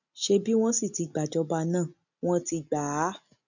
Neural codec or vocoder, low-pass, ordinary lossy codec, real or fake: none; 7.2 kHz; none; real